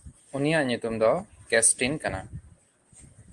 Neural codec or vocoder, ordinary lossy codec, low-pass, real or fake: none; Opus, 24 kbps; 10.8 kHz; real